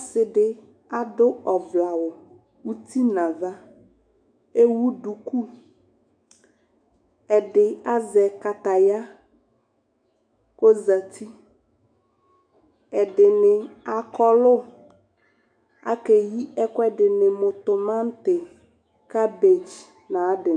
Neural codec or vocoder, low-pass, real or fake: autoencoder, 48 kHz, 128 numbers a frame, DAC-VAE, trained on Japanese speech; 9.9 kHz; fake